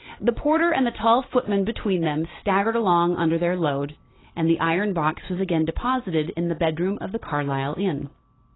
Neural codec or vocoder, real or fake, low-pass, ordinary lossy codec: none; real; 7.2 kHz; AAC, 16 kbps